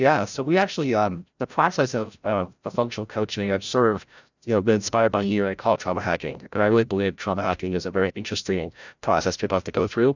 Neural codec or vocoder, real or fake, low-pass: codec, 16 kHz, 0.5 kbps, FreqCodec, larger model; fake; 7.2 kHz